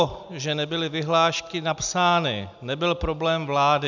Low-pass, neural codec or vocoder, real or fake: 7.2 kHz; none; real